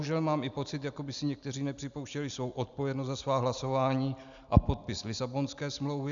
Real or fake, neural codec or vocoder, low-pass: real; none; 7.2 kHz